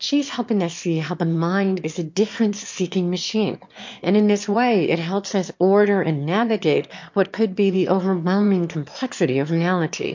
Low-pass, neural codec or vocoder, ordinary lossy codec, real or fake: 7.2 kHz; autoencoder, 22.05 kHz, a latent of 192 numbers a frame, VITS, trained on one speaker; MP3, 48 kbps; fake